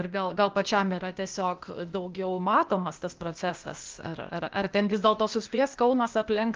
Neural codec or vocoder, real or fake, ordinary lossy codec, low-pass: codec, 16 kHz, 0.8 kbps, ZipCodec; fake; Opus, 24 kbps; 7.2 kHz